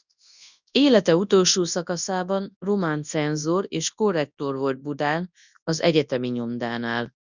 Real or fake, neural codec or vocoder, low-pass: fake; codec, 24 kHz, 0.9 kbps, WavTokenizer, large speech release; 7.2 kHz